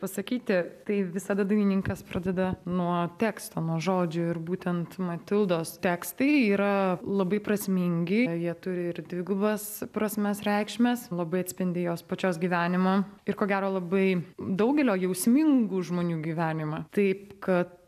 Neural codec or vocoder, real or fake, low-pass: none; real; 14.4 kHz